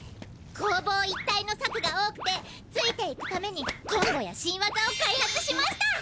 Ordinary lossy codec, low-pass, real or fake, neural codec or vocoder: none; none; real; none